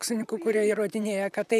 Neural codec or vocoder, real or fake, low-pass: vocoder, 44.1 kHz, 128 mel bands, Pupu-Vocoder; fake; 14.4 kHz